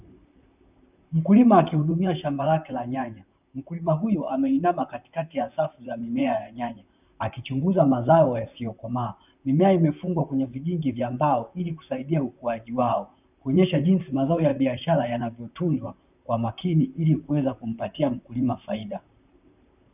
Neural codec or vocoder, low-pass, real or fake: vocoder, 44.1 kHz, 128 mel bands, Pupu-Vocoder; 3.6 kHz; fake